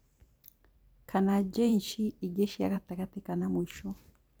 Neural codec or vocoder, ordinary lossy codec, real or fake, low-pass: vocoder, 44.1 kHz, 128 mel bands every 512 samples, BigVGAN v2; none; fake; none